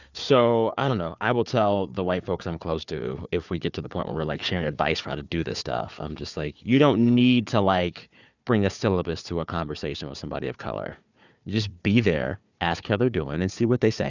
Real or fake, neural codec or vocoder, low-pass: fake; codec, 16 kHz, 4 kbps, FunCodec, trained on Chinese and English, 50 frames a second; 7.2 kHz